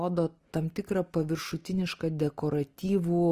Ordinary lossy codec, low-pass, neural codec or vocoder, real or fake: Opus, 24 kbps; 14.4 kHz; none; real